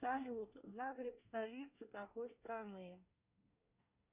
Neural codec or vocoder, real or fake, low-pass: codec, 24 kHz, 1 kbps, SNAC; fake; 3.6 kHz